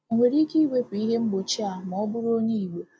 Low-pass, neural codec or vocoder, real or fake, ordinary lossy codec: none; none; real; none